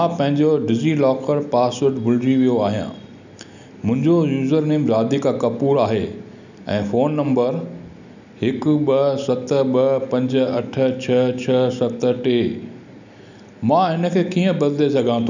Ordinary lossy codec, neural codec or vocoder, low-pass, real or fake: none; none; 7.2 kHz; real